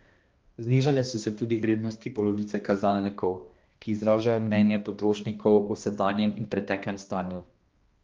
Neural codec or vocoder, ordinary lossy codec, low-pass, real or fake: codec, 16 kHz, 1 kbps, X-Codec, HuBERT features, trained on balanced general audio; Opus, 32 kbps; 7.2 kHz; fake